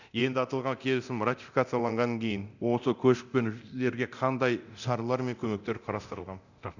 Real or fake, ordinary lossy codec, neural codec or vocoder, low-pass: fake; none; codec, 24 kHz, 0.9 kbps, DualCodec; 7.2 kHz